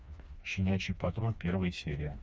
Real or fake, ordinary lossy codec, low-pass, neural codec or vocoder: fake; none; none; codec, 16 kHz, 2 kbps, FreqCodec, smaller model